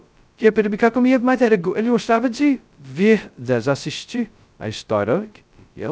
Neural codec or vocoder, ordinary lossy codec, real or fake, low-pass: codec, 16 kHz, 0.2 kbps, FocalCodec; none; fake; none